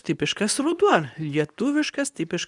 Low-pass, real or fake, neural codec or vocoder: 10.8 kHz; fake; codec, 24 kHz, 0.9 kbps, WavTokenizer, medium speech release version 2